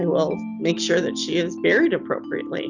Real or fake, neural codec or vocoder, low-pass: real; none; 7.2 kHz